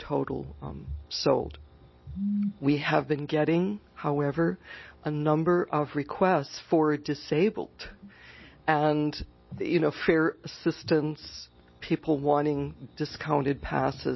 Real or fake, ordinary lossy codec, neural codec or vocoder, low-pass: real; MP3, 24 kbps; none; 7.2 kHz